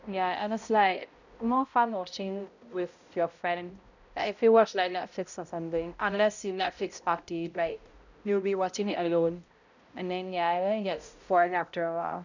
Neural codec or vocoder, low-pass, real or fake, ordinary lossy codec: codec, 16 kHz, 0.5 kbps, X-Codec, HuBERT features, trained on balanced general audio; 7.2 kHz; fake; none